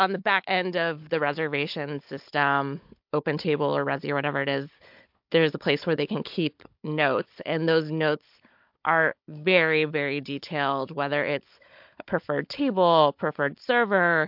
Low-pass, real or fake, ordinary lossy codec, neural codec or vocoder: 5.4 kHz; fake; MP3, 48 kbps; codec, 16 kHz, 16 kbps, FunCodec, trained on Chinese and English, 50 frames a second